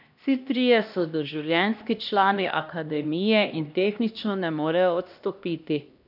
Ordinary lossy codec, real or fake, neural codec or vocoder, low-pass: none; fake; codec, 16 kHz, 1 kbps, X-Codec, HuBERT features, trained on LibriSpeech; 5.4 kHz